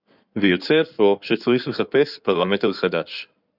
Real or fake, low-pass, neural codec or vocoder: fake; 5.4 kHz; codec, 16 kHz in and 24 kHz out, 2.2 kbps, FireRedTTS-2 codec